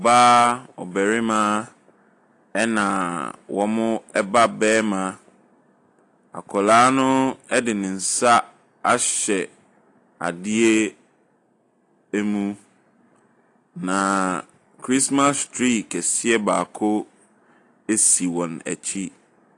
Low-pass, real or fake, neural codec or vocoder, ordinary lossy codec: 10.8 kHz; real; none; AAC, 48 kbps